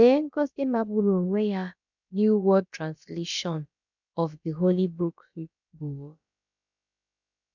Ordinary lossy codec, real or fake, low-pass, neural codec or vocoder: none; fake; 7.2 kHz; codec, 16 kHz, about 1 kbps, DyCAST, with the encoder's durations